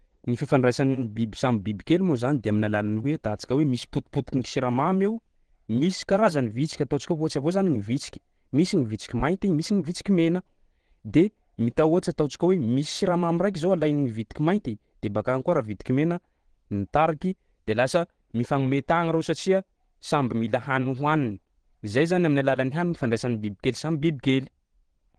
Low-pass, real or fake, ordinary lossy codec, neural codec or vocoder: 9.9 kHz; fake; Opus, 16 kbps; vocoder, 22.05 kHz, 80 mel bands, Vocos